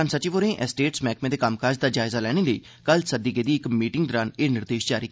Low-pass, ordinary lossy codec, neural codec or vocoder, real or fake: none; none; none; real